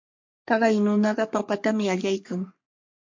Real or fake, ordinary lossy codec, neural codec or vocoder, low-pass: fake; MP3, 48 kbps; codec, 44.1 kHz, 3.4 kbps, Pupu-Codec; 7.2 kHz